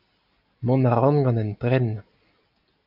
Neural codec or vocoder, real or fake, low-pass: none; real; 5.4 kHz